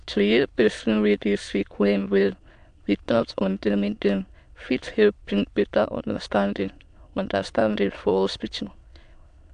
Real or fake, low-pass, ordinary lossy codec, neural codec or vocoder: fake; 9.9 kHz; AAC, 64 kbps; autoencoder, 22.05 kHz, a latent of 192 numbers a frame, VITS, trained on many speakers